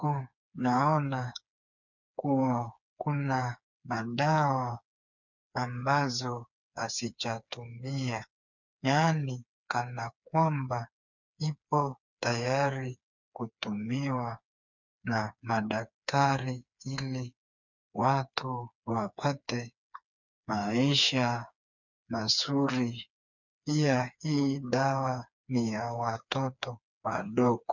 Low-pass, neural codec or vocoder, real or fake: 7.2 kHz; codec, 16 kHz, 4 kbps, FreqCodec, smaller model; fake